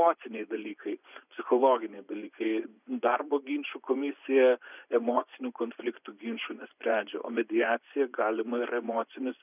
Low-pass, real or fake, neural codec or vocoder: 3.6 kHz; fake; vocoder, 24 kHz, 100 mel bands, Vocos